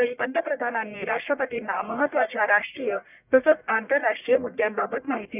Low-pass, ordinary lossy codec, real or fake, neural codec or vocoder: 3.6 kHz; none; fake; codec, 44.1 kHz, 1.7 kbps, Pupu-Codec